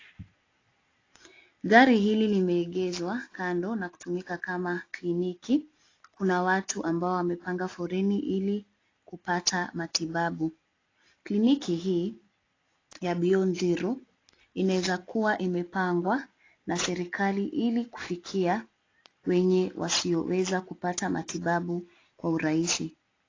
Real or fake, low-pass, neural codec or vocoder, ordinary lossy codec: real; 7.2 kHz; none; AAC, 32 kbps